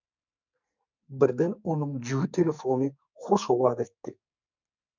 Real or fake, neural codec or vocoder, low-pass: fake; codec, 44.1 kHz, 2.6 kbps, SNAC; 7.2 kHz